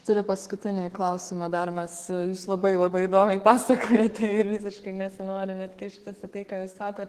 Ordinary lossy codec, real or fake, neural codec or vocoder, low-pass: Opus, 16 kbps; fake; codec, 32 kHz, 1.9 kbps, SNAC; 14.4 kHz